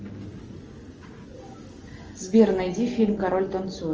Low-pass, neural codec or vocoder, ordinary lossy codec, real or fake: 7.2 kHz; none; Opus, 24 kbps; real